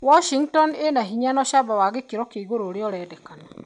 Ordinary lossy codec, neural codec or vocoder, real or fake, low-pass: none; none; real; 9.9 kHz